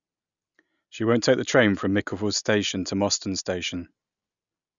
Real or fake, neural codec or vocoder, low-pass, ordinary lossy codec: real; none; 7.2 kHz; none